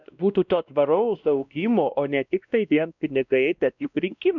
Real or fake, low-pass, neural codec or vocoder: fake; 7.2 kHz; codec, 16 kHz, 1 kbps, X-Codec, WavLM features, trained on Multilingual LibriSpeech